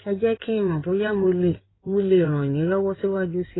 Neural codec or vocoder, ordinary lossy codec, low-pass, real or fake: codec, 16 kHz in and 24 kHz out, 2.2 kbps, FireRedTTS-2 codec; AAC, 16 kbps; 7.2 kHz; fake